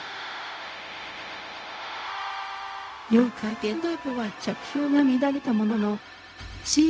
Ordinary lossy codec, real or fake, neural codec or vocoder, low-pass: none; fake; codec, 16 kHz, 0.4 kbps, LongCat-Audio-Codec; none